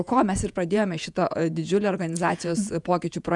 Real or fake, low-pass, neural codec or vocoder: fake; 10.8 kHz; vocoder, 48 kHz, 128 mel bands, Vocos